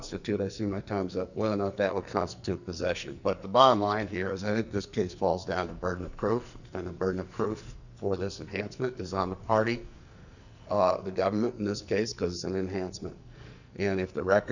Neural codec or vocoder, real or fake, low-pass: codec, 44.1 kHz, 2.6 kbps, SNAC; fake; 7.2 kHz